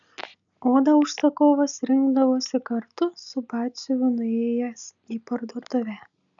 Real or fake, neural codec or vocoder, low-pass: real; none; 7.2 kHz